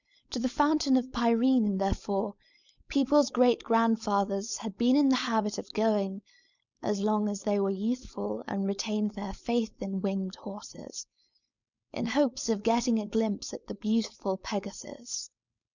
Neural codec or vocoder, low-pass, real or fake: codec, 16 kHz, 4.8 kbps, FACodec; 7.2 kHz; fake